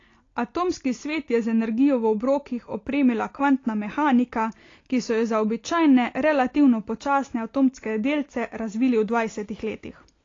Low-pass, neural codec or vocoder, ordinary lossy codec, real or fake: 7.2 kHz; none; AAC, 32 kbps; real